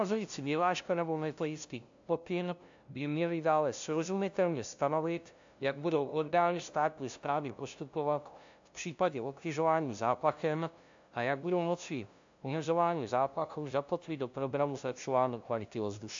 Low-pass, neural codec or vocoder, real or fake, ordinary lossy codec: 7.2 kHz; codec, 16 kHz, 0.5 kbps, FunCodec, trained on LibriTTS, 25 frames a second; fake; AAC, 64 kbps